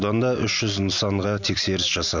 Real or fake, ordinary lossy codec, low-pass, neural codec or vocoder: real; none; 7.2 kHz; none